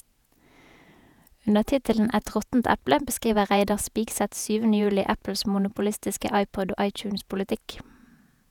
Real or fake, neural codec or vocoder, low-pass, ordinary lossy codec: fake; vocoder, 48 kHz, 128 mel bands, Vocos; 19.8 kHz; none